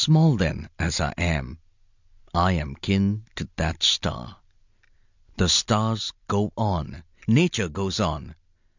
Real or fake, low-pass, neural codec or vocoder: real; 7.2 kHz; none